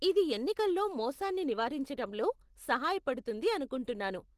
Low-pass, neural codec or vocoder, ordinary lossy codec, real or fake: 14.4 kHz; none; Opus, 16 kbps; real